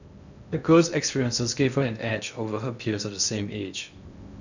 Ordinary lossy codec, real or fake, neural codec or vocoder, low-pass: none; fake; codec, 16 kHz in and 24 kHz out, 0.6 kbps, FocalCodec, streaming, 2048 codes; 7.2 kHz